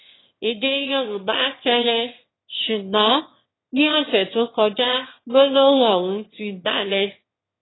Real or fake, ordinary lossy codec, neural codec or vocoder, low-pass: fake; AAC, 16 kbps; autoencoder, 22.05 kHz, a latent of 192 numbers a frame, VITS, trained on one speaker; 7.2 kHz